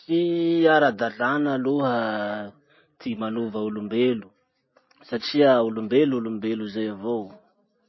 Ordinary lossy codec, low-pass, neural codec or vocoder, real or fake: MP3, 24 kbps; 7.2 kHz; none; real